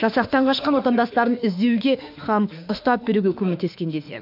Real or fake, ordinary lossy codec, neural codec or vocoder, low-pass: fake; none; autoencoder, 48 kHz, 32 numbers a frame, DAC-VAE, trained on Japanese speech; 5.4 kHz